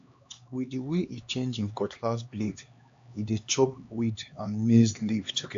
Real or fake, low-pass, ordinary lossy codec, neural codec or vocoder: fake; 7.2 kHz; AAC, 64 kbps; codec, 16 kHz, 2 kbps, X-Codec, HuBERT features, trained on LibriSpeech